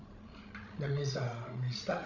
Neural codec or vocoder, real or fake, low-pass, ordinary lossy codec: codec, 16 kHz, 16 kbps, FreqCodec, larger model; fake; 7.2 kHz; none